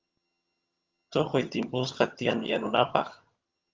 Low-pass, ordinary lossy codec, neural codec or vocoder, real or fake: 7.2 kHz; Opus, 32 kbps; vocoder, 22.05 kHz, 80 mel bands, HiFi-GAN; fake